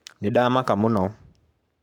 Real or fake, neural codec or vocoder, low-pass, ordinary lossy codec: fake; codec, 44.1 kHz, 7.8 kbps, Pupu-Codec; 19.8 kHz; none